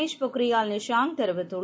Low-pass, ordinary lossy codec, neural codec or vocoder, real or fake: none; none; none; real